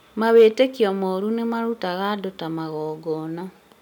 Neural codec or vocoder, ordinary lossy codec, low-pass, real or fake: none; none; 19.8 kHz; real